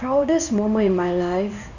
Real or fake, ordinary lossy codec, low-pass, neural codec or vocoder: fake; none; 7.2 kHz; codec, 16 kHz in and 24 kHz out, 1 kbps, XY-Tokenizer